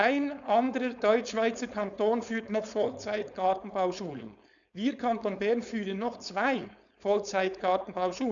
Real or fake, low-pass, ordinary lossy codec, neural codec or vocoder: fake; 7.2 kHz; none; codec, 16 kHz, 4.8 kbps, FACodec